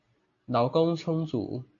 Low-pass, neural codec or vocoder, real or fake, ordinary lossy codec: 7.2 kHz; none; real; AAC, 48 kbps